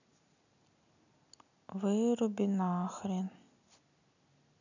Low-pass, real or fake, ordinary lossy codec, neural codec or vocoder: 7.2 kHz; real; none; none